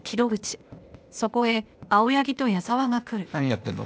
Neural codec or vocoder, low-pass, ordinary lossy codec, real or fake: codec, 16 kHz, 0.8 kbps, ZipCodec; none; none; fake